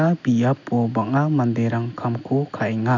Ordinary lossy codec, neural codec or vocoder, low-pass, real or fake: none; none; 7.2 kHz; real